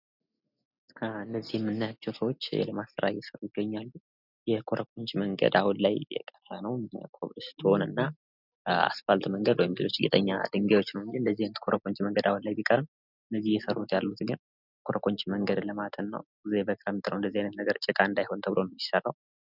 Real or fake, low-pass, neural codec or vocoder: real; 5.4 kHz; none